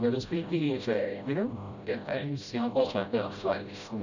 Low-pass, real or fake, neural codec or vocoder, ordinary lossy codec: 7.2 kHz; fake; codec, 16 kHz, 0.5 kbps, FreqCodec, smaller model; none